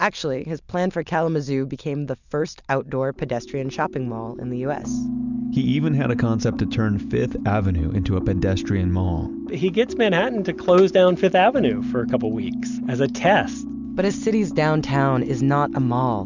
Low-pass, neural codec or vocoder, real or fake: 7.2 kHz; none; real